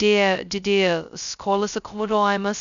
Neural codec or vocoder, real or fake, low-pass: codec, 16 kHz, 0.2 kbps, FocalCodec; fake; 7.2 kHz